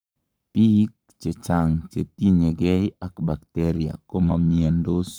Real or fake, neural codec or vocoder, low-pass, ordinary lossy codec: fake; codec, 44.1 kHz, 7.8 kbps, Pupu-Codec; none; none